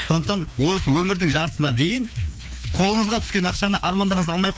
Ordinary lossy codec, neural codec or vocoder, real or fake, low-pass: none; codec, 16 kHz, 2 kbps, FreqCodec, larger model; fake; none